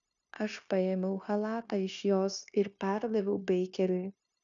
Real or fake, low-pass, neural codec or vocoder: fake; 7.2 kHz; codec, 16 kHz, 0.9 kbps, LongCat-Audio-Codec